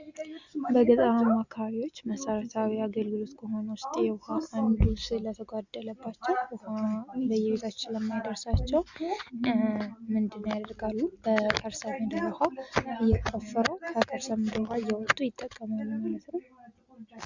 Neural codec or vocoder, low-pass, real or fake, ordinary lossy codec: none; 7.2 kHz; real; Opus, 64 kbps